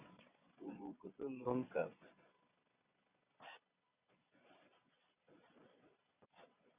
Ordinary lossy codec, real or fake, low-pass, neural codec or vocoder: Opus, 64 kbps; fake; 3.6 kHz; vocoder, 22.05 kHz, 80 mel bands, Vocos